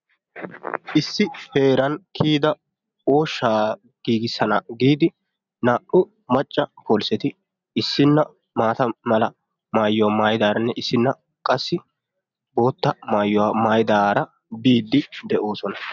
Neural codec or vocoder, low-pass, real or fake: none; 7.2 kHz; real